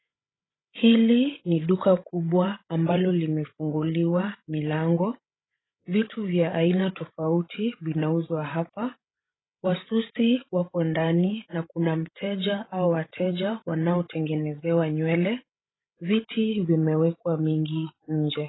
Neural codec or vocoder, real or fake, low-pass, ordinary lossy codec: codec, 16 kHz, 16 kbps, FreqCodec, larger model; fake; 7.2 kHz; AAC, 16 kbps